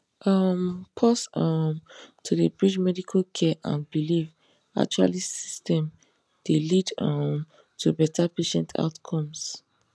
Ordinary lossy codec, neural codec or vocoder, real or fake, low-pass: none; none; real; none